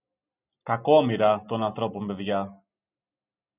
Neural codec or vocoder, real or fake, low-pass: none; real; 3.6 kHz